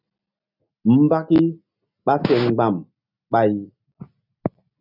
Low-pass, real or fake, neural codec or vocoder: 5.4 kHz; real; none